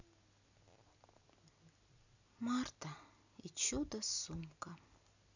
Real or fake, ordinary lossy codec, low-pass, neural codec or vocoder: real; none; 7.2 kHz; none